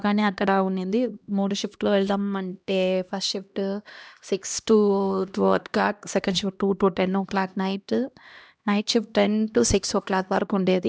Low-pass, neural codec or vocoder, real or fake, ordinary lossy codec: none; codec, 16 kHz, 1 kbps, X-Codec, HuBERT features, trained on LibriSpeech; fake; none